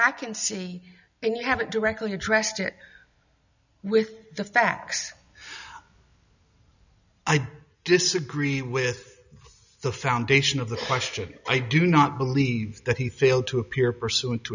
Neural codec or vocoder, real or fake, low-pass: none; real; 7.2 kHz